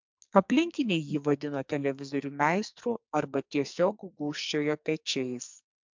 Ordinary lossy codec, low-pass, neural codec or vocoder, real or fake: MP3, 64 kbps; 7.2 kHz; codec, 44.1 kHz, 2.6 kbps, SNAC; fake